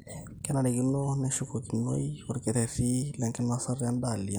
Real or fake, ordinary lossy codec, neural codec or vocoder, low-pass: real; none; none; none